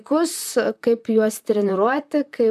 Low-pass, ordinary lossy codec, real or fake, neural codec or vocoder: 14.4 kHz; MP3, 96 kbps; fake; vocoder, 44.1 kHz, 128 mel bands, Pupu-Vocoder